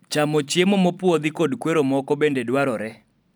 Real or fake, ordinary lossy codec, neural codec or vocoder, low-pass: real; none; none; none